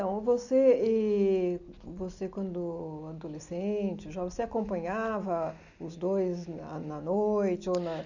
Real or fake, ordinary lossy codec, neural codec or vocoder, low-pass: real; none; none; 7.2 kHz